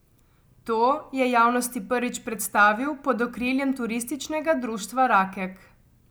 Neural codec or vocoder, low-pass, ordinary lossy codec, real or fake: none; none; none; real